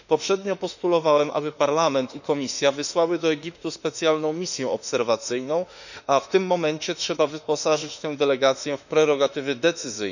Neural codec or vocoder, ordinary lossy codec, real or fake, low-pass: autoencoder, 48 kHz, 32 numbers a frame, DAC-VAE, trained on Japanese speech; none; fake; 7.2 kHz